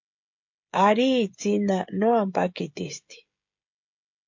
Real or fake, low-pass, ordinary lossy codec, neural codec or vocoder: fake; 7.2 kHz; MP3, 48 kbps; codec, 16 kHz, 16 kbps, FreqCodec, smaller model